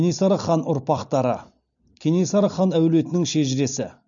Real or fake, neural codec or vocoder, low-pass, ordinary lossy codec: real; none; 7.2 kHz; none